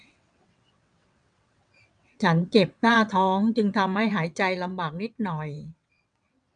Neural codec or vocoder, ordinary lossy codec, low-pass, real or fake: vocoder, 22.05 kHz, 80 mel bands, WaveNeXt; MP3, 96 kbps; 9.9 kHz; fake